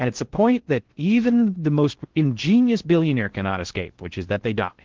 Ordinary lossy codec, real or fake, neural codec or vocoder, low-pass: Opus, 16 kbps; fake; codec, 16 kHz in and 24 kHz out, 0.6 kbps, FocalCodec, streaming, 4096 codes; 7.2 kHz